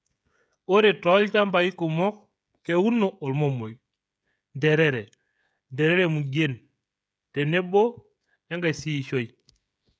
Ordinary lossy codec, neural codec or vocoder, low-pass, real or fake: none; codec, 16 kHz, 16 kbps, FreqCodec, smaller model; none; fake